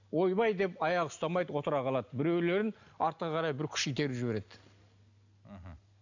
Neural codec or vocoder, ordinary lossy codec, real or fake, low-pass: none; none; real; 7.2 kHz